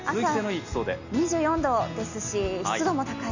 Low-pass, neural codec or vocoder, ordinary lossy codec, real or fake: 7.2 kHz; none; none; real